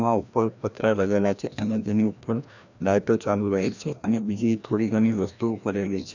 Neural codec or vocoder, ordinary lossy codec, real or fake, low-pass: codec, 16 kHz, 1 kbps, FreqCodec, larger model; none; fake; 7.2 kHz